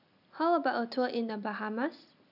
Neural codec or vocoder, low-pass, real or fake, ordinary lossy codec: none; 5.4 kHz; real; none